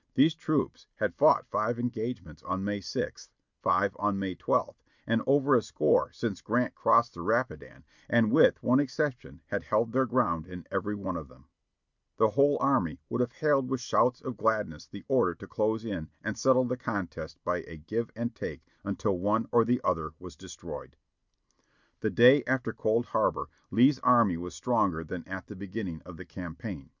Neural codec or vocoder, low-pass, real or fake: none; 7.2 kHz; real